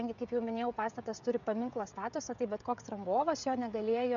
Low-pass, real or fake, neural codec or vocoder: 7.2 kHz; fake; codec, 16 kHz, 16 kbps, FreqCodec, smaller model